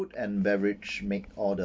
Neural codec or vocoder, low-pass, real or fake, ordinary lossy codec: none; none; real; none